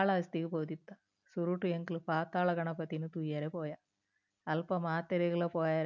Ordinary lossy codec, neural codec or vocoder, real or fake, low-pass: none; none; real; 7.2 kHz